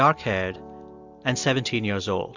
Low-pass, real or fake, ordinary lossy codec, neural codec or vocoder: 7.2 kHz; real; Opus, 64 kbps; none